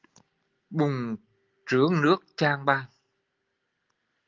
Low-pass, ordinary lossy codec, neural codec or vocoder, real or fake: 7.2 kHz; Opus, 32 kbps; none; real